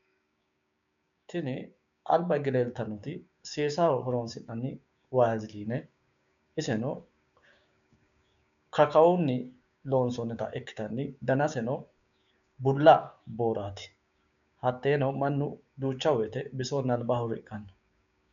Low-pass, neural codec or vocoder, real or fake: 7.2 kHz; codec, 16 kHz, 6 kbps, DAC; fake